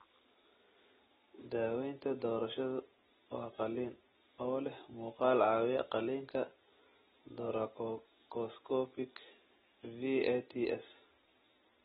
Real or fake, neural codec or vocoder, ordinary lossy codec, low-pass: real; none; AAC, 16 kbps; 19.8 kHz